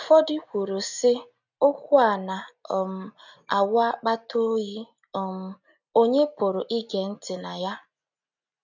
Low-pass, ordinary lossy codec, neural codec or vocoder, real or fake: 7.2 kHz; none; none; real